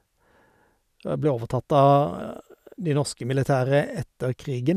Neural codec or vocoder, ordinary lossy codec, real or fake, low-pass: none; none; real; 14.4 kHz